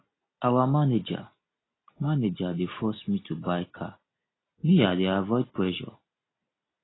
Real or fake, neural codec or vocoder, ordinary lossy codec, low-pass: real; none; AAC, 16 kbps; 7.2 kHz